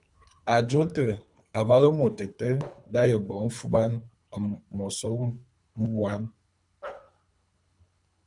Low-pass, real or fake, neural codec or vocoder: 10.8 kHz; fake; codec, 24 kHz, 3 kbps, HILCodec